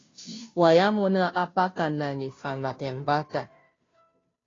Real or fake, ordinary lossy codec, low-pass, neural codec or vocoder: fake; AAC, 32 kbps; 7.2 kHz; codec, 16 kHz, 0.5 kbps, FunCodec, trained on Chinese and English, 25 frames a second